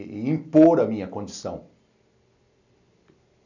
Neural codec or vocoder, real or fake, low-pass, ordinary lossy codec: none; real; 7.2 kHz; none